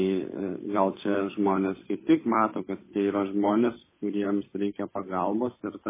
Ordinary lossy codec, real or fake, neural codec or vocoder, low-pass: MP3, 16 kbps; fake; codec, 16 kHz, 8 kbps, FunCodec, trained on Chinese and English, 25 frames a second; 3.6 kHz